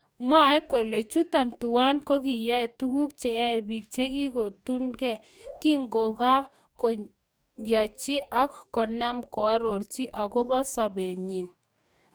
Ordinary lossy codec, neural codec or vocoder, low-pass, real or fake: none; codec, 44.1 kHz, 2.6 kbps, DAC; none; fake